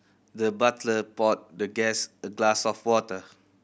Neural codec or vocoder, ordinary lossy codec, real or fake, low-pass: none; none; real; none